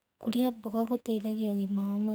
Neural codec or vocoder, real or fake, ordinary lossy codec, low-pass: codec, 44.1 kHz, 2.6 kbps, SNAC; fake; none; none